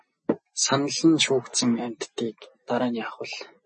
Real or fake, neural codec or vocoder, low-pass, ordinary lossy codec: real; none; 10.8 kHz; MP3, 32 kbps